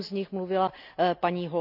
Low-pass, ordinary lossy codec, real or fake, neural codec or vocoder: 5.4 kHz; none; real; none